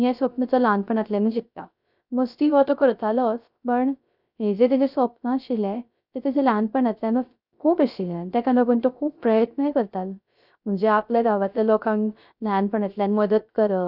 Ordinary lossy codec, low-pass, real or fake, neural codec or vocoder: none; 5.4 kHz; fake; codec, 16 kHz, 0.3 kbps, FocalCodec